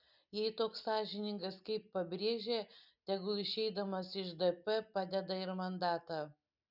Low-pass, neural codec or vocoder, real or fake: 5.4 kHz; none; real